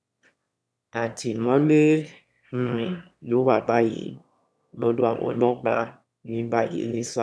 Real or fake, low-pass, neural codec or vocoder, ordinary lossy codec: fake; none; autoencoder, 22.05 kHz, a latent of 192 numbers a frame, VITS, trained on one speaker; none